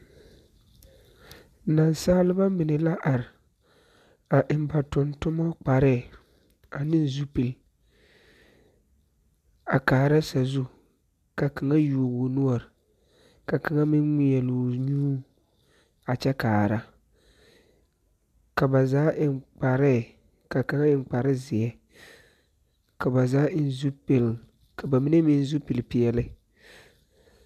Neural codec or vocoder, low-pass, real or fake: none; 14.4 kHz; real